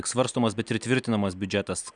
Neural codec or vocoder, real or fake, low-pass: none; real; 9.9 kHz